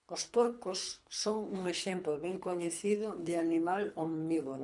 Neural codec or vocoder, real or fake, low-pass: codec, 24 kHz, 3 kbps, HILCodec; fake; 10.8 kHz